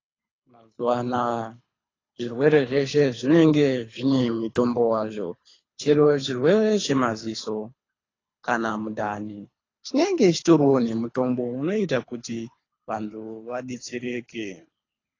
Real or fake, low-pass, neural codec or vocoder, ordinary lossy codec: fake; 7.2 kHz; codec, 24 kHz, 3 kbps, HILCodec; AAC, 32 kbps